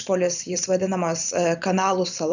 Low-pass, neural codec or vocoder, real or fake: 7.2 kHz; vocoder, 44.1 kHz, 128 mel bands every 512 samples, BigVGAN v2; fake